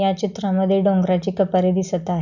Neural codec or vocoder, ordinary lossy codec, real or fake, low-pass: none; none; real; 7.2 kHz